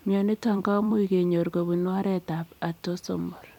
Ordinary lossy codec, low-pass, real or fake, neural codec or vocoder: none; 19.8 kHz; fake; vocoder, 44.1 kHz, 128 mel bands every 256 samples, BigVGAN v2